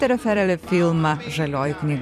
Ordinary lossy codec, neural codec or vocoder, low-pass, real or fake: AAC, 96 kbps; vocoder, 44.1 kHz, 128 mel bands every 256 samples, BigVGAN v2; 14.4 kHz; fake